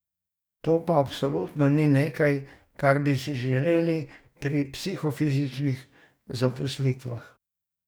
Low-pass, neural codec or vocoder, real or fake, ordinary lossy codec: none; codec, 44.1 kHz, 2.6 kbps, DAC; fake; none